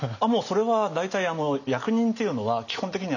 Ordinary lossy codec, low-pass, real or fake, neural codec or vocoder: none; 7.2 kHz; real; none